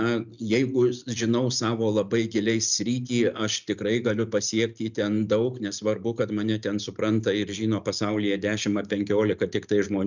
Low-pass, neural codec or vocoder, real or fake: 7.2 kHz; none; real